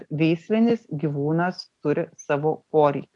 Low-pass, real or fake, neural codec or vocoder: 10.8 kHz; real; none